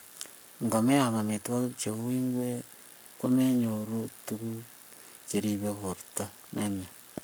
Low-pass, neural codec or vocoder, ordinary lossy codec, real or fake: none; codec, 44.1 kHz, 7.8 kbps, Pupu-Codec; none; fake